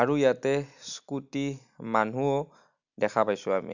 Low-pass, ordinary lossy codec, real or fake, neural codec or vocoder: 7.2 kHz; none; real; none